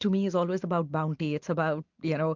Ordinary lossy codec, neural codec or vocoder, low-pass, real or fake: MP3, 64 kbps; none; 7.2 kHz; real